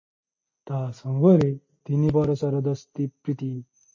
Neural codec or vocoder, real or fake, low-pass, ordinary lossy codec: none; real; 7.2 kHz; MP3, 32 kbps